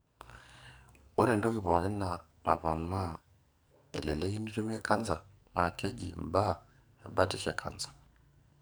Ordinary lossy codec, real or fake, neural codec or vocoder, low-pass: none; fake; codec, 44.1 kHz, 2.6 kbps, SNAC; none